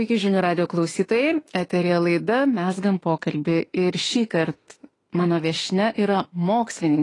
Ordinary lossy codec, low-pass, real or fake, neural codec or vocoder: AAC, 32 kbps; 10.8 kHz; fake; autoencoder, 48 kHz, 32 numbers a frame, DAC-VAE, trained on Japanese speech